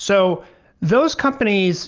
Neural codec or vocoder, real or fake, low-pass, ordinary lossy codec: none; real; 7.2 kHz; Opus, 24 kbps